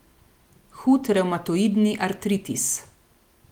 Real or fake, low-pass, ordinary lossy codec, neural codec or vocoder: real; 19.8 kHz; Opus, 24 kbps; none